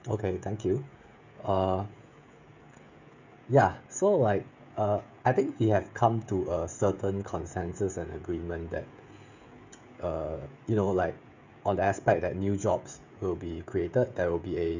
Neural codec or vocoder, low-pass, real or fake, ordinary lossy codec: codec, 16 kHz, 16 kbps, FreqCodec, smaller model; 7.2 kHz; fake; none